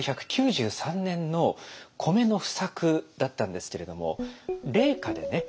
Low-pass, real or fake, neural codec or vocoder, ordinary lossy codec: none; real; none; none